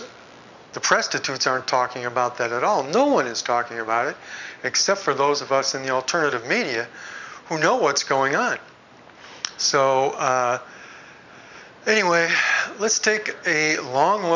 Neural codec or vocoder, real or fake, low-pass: none; real; 7.2 kHz